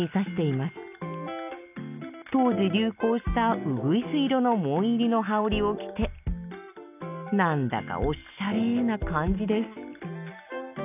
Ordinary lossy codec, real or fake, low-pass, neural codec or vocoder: none; real; 3.6 kHz; none